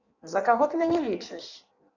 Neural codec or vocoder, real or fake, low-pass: codec, 16 kHz in and 24 kHz out, 1.1 kbps, FireRedTTS-2 codec; fake; 7.2 kHz